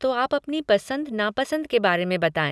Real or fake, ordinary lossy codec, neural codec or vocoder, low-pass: real; none; none; none